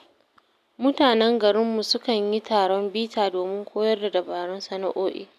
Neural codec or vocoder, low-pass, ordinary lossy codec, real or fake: none; 14.4 kHz; none; real